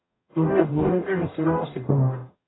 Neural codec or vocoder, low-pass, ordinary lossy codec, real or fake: codec, 44.1 kHz, 0.9 kbps, DAC; 7.2 kHz; AAC, 16 kbps; fake